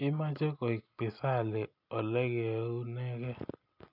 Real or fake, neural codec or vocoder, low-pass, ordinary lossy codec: real; none; 5.4 kHz; none